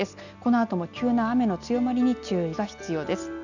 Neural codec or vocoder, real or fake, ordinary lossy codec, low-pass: none; real; none; 7.2 kHz